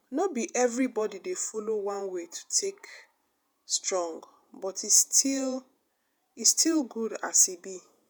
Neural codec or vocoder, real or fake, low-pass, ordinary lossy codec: vocoder, 48 kHz, 128 mel bands, Vocos; fake; none; none